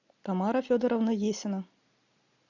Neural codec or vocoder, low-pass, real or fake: none; 7.2 kHz; real